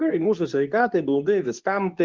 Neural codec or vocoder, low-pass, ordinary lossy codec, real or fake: codec, 24 kHz, 0.9 kbps, WavTokenizer, medium speech release version 2; 7.2 kHz; Opus, 24 kbps; fake